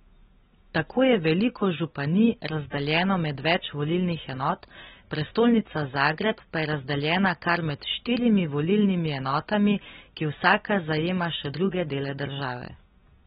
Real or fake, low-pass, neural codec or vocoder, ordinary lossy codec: real; 7.2 kHz; none; AAC, 16 kbps